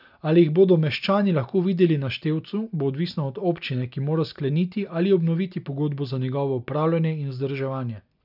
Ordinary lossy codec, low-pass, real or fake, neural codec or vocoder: none; 5.4 kHz; real; none